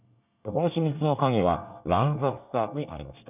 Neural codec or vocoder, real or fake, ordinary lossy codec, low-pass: codec, 24 kHz, 1 kbps, SNAC; fake; none; 3.6 kHz